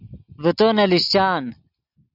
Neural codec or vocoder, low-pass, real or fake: none; 5.4 kHz; real